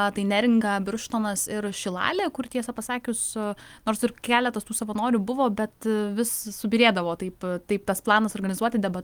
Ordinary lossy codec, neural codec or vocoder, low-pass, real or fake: Opus, 32 kbps; none; 19.8 kHz; real